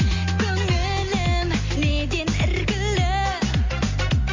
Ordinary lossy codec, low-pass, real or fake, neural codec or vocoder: MP3, 32 kbps; 7.2 kHz; real; none